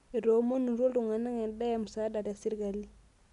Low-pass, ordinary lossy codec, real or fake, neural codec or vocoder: 10.8 kHz; none; real; none